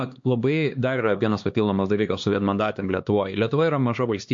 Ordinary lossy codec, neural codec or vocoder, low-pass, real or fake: MP3, 48 kbps; codec, 16 kHz, 2 kbps, X-Codec, HuBERT features, trained on LibriSpeech; 7.2 kHz; fake